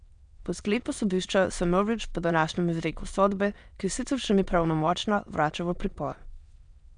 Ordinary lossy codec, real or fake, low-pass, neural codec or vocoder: none; fake; 9.9 kHz; autoencoder, 22.05 kHz, a latent of 192 numbers a frame, VITS, trained on many speakers